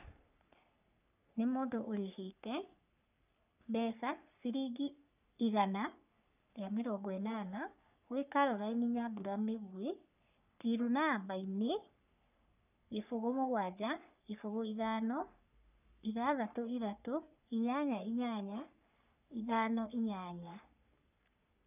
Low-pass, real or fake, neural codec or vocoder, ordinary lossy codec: 3.6 kHz; fake; codec, 44.1 kHz, 3.4 kbps, Pupu-Codec; none